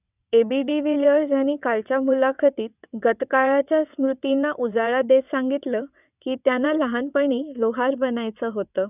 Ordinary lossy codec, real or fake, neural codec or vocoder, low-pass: none; fake; vocoder, 22.05 kHz, 80 mel bands, WaveNeXt; 3.6 kHz